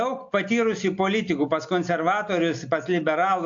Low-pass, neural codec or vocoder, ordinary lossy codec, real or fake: 7.2 kHz; none; AAC, 64 kbps; real